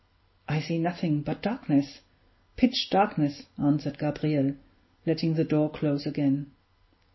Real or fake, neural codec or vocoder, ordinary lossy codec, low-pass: real; none; MP3, 24 kbps; 7.2 kHz